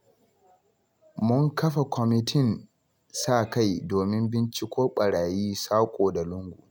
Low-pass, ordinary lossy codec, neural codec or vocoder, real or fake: none; none; none; real